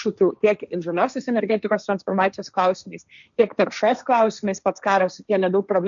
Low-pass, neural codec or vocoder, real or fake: 7.2 kHz; codec, 16 kHz, 1.1 kbps, Voila-Tokenizer; fake